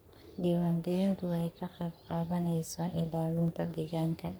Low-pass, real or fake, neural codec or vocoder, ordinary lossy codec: none; fake; codec, 44.1 kHz, 3.4 kbps, Pupu-Codec; none